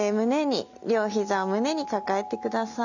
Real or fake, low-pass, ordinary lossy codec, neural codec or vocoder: real; 7.2 kHz; none; none